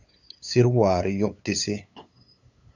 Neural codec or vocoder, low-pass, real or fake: codec, 16 kHz, 8 kbps, FunCodec, trained on LibriTTS, 25 frames a second; 7.2 kHz; fake